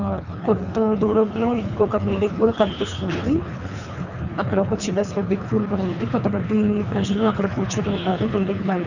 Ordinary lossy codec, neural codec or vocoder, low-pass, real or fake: none; codec, 24 kHz, 3 kbps, HILCodec; 7.2 kHz; fake